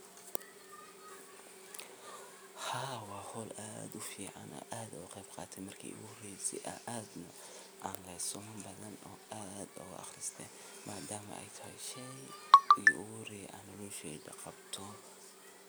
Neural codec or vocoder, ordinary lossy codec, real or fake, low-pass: none; none; real; none